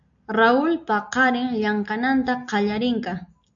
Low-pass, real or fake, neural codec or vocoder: 7.2 kHz; real; none